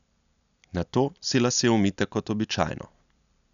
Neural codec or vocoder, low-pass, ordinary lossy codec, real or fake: none; 7.2 kHz; none; real